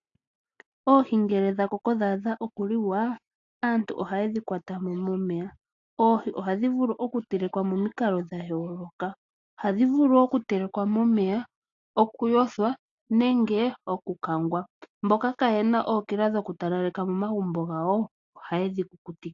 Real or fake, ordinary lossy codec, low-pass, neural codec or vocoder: real; AAC, 48 kbps; 7.2 kHz; none